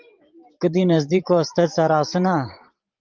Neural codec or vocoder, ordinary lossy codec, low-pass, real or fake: vocoder, 44.1 kHz, 128 mel bands every 512 samples, BigVGAN v2; Opus, 32 kbps; 7.2 kHz; fake